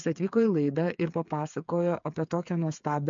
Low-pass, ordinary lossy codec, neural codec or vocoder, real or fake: 7.2 kHz; MP3, 64 kbps; codec, 16 kHz, 8 kbps, FreqCodec, smaller model; fake